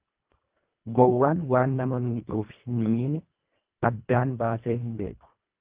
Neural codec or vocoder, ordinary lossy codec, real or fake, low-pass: codec, 24 kHz, 1.5 kbps, HILCodec; Opus, 32 kbps; fake; 3.6 kHz